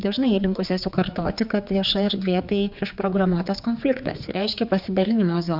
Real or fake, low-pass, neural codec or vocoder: fake; 5.4 kHz; codec, 16 kHz, 4 kbps, X-Codec, HuBERT features, trained on general audio